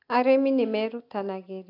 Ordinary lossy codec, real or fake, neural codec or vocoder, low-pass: AAC, 32 kbps; real; none; 5.4 kHz